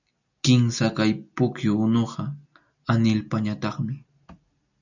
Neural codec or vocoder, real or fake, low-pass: none; real; 7.2 kHz